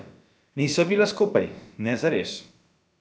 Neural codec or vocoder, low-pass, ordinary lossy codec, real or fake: codec, 16 kHz, about 1 kbps, DyCAST, with the encoder's durations; none; none; fake